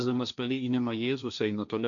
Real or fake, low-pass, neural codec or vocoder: fake; 7.2 kHz; codec, 16 kHz, 1.1 kbps, Voila-Tokenizer